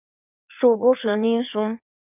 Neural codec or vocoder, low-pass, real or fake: codec, 16 kHz in and 24 kHz out, 1.1 kbps, FireRedTTS-2 codec; 3.6 kHz; fake